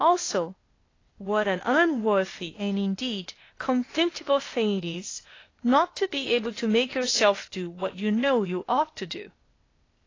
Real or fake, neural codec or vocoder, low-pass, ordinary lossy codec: fake; codec, 16 kHz, 0.8 kbps, ZipCodec; 7.2 kHz; AAC, 32 kbps